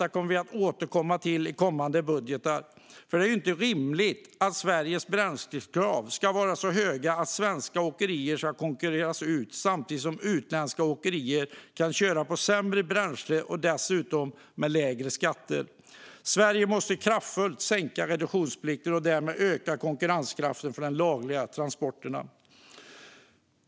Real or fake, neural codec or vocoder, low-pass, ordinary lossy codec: real; none; none; none